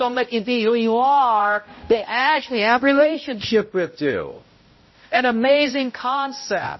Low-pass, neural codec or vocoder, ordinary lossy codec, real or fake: 7.2 kHz; codec, 16 kHz, 0.5 kbps, X-Codec, HuBERT features, trained on balanced general audio; MP3, 24 kbps; fake